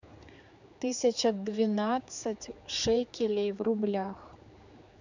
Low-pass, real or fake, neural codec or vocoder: 7.2 kHz; fake; codec, 16 kHz, 4 kbps, X-Codec, HuBERT features, trained on general audio